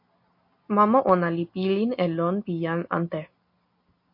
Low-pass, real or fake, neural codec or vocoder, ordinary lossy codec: 5.4 kHz; real; none; MP3, 32 kbps